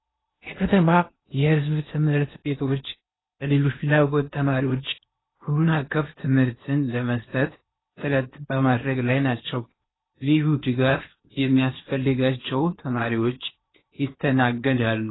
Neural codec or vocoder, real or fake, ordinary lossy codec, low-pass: codec, 16 kHz in and 24 kHz out, 0.8 kbps, FocalCodec, streaming, 65536 codes; fake; AAC, 16 kbps; 7.2 kHz